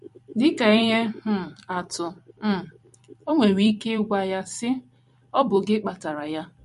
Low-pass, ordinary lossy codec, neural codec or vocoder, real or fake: 14.4 kHz; MP3, 48 kbps; vocoder, 44.1 kHz, 128 mel bands every 256 samples, BigVGAN v2; fake